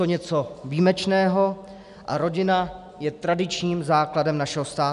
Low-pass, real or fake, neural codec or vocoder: 10.8 kHz; real; none